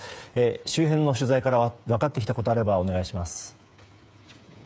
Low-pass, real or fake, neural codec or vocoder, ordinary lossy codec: none; fake; codec, 16 kHz, 8 kbps, FreqCodec, smaller model; none